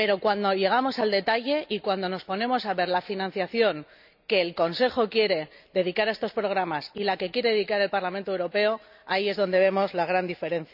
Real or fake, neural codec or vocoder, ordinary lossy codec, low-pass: real; none; none; 5.4 kHz